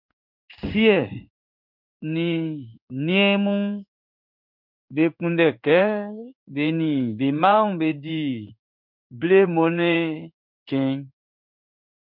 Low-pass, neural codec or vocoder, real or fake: 5.4 kHz; codec, 16 kHz in and 24 kHz out, 1 kbps, XY-Tokenizer; fake